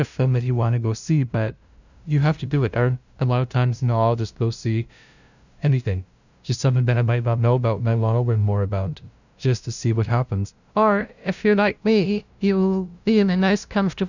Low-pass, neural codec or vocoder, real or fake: 7.2 kHz; codec, 16 kHz, 0.5 kbps, FunCodec, trained on LibriTTS, 25 frames a second; fake